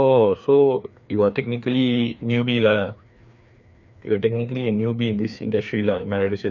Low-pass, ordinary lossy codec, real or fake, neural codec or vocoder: 7.2 kHz; none; fake; codec, 16 kHz, 2 kbps, FreqCodec, larger model